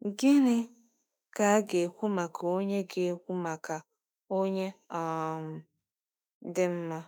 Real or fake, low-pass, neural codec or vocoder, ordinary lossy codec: fake; none; autoencoder, 48 kHz, 32 numbers a frame, DAC-VAE, trained on Japanese speech; none